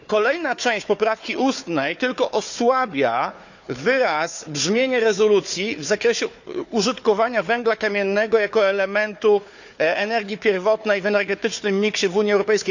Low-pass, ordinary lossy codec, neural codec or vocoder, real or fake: 7.2 kHz; none; codec, 16 kHz, 4 kbps, FunCodec, trained on Chinese and English, 50 frames a second; fake